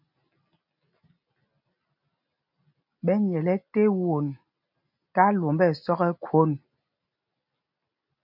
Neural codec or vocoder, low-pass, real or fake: none; 5.4 kHz; real